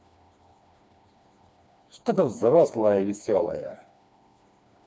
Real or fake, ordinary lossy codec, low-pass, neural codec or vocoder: fake; none; none; codec, 16 kHz, 2 kbps, FreqCodec, smaller model